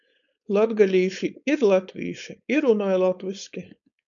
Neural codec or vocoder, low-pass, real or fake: codec, 16 kHz, 4.8 kbps, FACodec; 7.2 kHz; fake